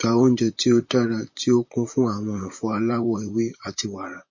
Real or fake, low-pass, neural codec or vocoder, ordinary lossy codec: fake; 7.2 kHz; vocoder, 44.1 kHz, 128 mel bands, Pupu-Vocoder; MP3, 32 kbps